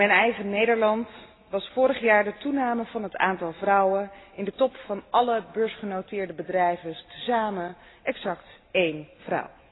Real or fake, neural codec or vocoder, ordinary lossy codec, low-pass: real; none; AAC, 16 kbps; 7.2 kHz